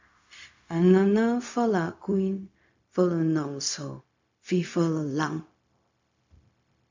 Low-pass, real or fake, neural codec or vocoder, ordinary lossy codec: 7.2 kHz; fake; codec, 16 kHz, 0.4 kbps, LongCat-Audio-Codec; MP3, 64 kbps